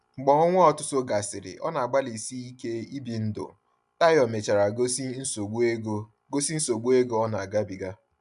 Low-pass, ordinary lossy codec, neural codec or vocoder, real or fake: 10.8 kHz; none; none; real